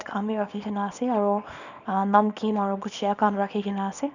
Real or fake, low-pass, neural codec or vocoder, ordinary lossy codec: fake; 7.2 kHz; codec, 24 kHz, 0.9 kbps, WavTokenizer, small release; none